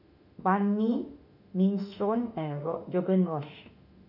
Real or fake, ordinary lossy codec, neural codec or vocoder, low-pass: fake; none; autoencoder, 48 kHz, 32 numbers a frame, DAC-VAE, trained on Japanese speech; 5.4 kHz